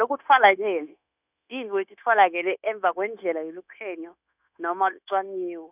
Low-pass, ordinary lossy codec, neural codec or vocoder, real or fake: 3.6 kHz; none; codec, 16 kHz, 0.9 kbps, LongCat-Audio-Codec; fake